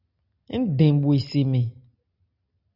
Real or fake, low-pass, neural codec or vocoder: real; 5.4 kHz; none